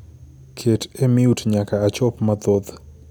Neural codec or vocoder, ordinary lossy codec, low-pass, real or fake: none; none; none; real